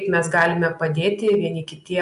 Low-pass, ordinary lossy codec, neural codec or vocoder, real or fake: 10.8 kHz; AAC, 96 kbps; none; real